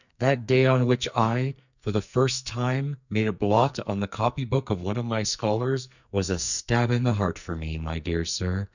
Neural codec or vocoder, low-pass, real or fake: codec, 44.1 kHz, 2.6 kbps, SNAC; 7.2 kHz; fake